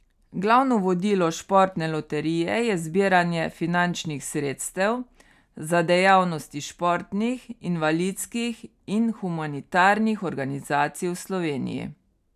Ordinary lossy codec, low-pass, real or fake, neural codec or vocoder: none; 14.4 kHz; real; none